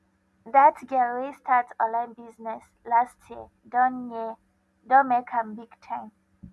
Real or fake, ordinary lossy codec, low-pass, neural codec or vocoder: real; none; none; none